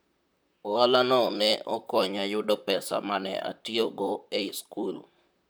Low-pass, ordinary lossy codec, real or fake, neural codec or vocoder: none; none; fake; vocoder, 44.1 kHz, 128 mel bands, Pupu-Vocoder